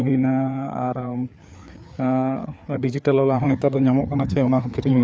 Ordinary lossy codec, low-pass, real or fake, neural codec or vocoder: none; none; fake; codec, 16 kHz, 4 kbps, FreqCodec, larger model